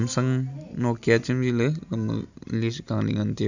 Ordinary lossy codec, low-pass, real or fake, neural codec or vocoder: none; 7.2 kHz; real; none